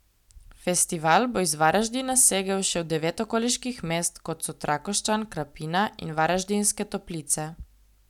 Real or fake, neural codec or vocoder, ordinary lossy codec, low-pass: real; none; none; 19.8 kHz